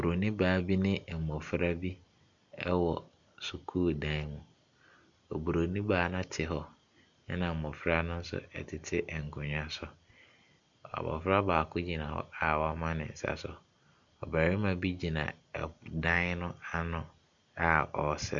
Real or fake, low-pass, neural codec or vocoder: real; 7.2 kHz; none